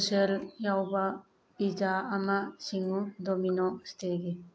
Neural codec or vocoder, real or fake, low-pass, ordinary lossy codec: none; real; none; none